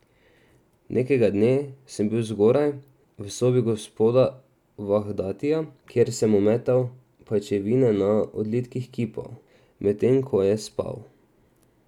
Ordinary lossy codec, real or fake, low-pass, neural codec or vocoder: none; real; 19.8 kHz; none